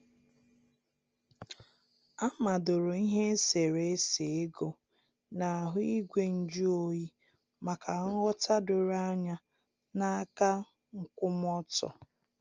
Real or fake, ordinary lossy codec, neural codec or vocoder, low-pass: real; Opus, 24 kbps; none; 7.2 kHz